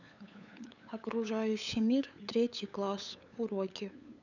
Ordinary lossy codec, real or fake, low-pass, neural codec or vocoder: none; fake; 7.2 kHz; codec, 16 kHz, 8 kbps, FunCodec, trained on LibriTTS, 25 frames a second